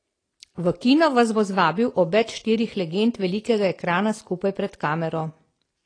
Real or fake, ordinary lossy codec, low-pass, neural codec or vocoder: real; AAC, 32 kbps; 9.9 kHz; none